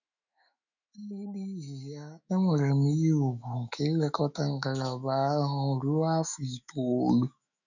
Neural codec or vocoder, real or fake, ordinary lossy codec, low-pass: autoencoder, 48 kHz, 128 numbers a frame, DAC-VAE, trained on Japanese speech; fake; none; 7.2 kHz